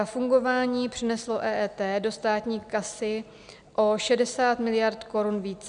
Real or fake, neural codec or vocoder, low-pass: real; none; 9.9 kHz